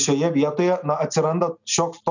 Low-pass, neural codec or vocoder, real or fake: 7.2 kHz; none; real